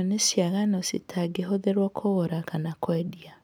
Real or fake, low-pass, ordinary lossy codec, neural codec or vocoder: real; none; none; none